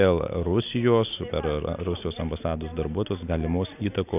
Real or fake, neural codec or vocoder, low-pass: real; none; 3.6 kHz